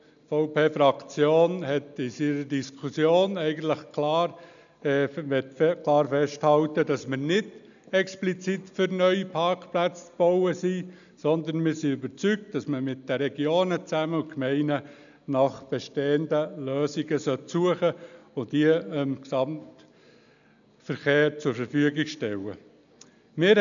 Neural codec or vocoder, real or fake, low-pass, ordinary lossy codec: none; real; 7.2 kHz; none